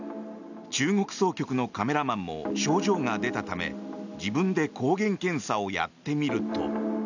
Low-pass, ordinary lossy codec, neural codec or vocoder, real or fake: 7.2 kHz; none; none; real